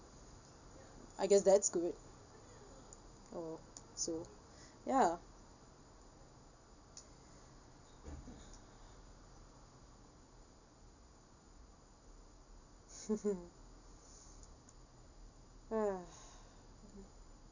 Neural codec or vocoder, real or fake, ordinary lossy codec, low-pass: none; real; none; 7.2 kHz